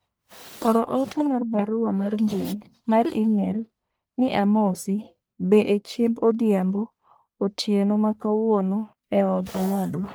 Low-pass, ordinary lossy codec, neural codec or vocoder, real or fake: none; none; codec, 44.1 kHz, 1.7 kbps, Pupu-Codec; fake